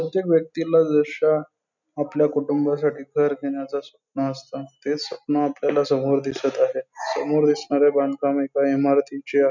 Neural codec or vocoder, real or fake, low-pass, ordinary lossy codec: none; real; 7.2 kHz; none